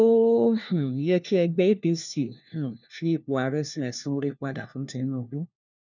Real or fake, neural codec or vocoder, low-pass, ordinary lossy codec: fake; codec, 16 kHz, 1 kbps, FunCodec, trained on LibriTTS, 50 frames a second; 7.2 kHz; none